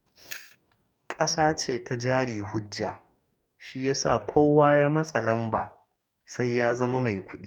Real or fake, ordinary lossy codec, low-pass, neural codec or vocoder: fake; none; 19.8 kHz; codec, 44.1 kHz, 2.6 kbps, DAC